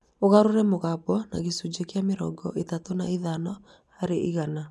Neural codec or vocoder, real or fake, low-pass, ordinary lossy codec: none; real; none; none